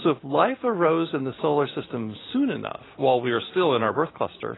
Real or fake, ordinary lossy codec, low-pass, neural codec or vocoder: real; AAC, 16 kbps; 7.2 kHz; none